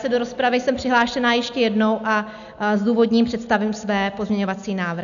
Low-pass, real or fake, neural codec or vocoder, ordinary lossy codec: 7.2 kHz; real; none; MP3, 96 kbps